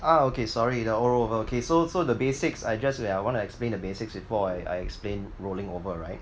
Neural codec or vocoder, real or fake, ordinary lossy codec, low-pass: none; real; none; none